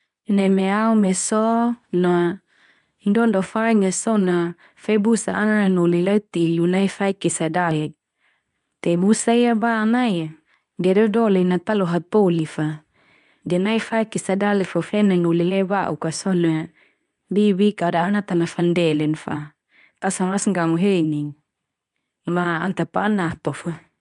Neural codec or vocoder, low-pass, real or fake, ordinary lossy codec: codec, 24 kHz, 0.9 kbps, WavTokenizer, medium speech release version 2; 10.8 kHz; fake; none